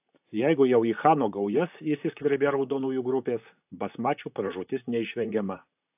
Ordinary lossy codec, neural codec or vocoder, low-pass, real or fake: AAC, 32 kbps; vocoder, 44.1 kHz, 128 mel bands, Pupu-Vocoder; 3.6 kHz; fake